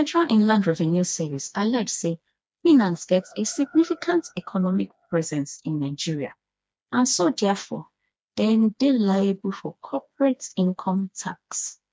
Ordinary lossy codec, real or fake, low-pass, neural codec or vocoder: none; fake; none; codec, 16 kHz, 2 kbps, FreqCodec, smaller model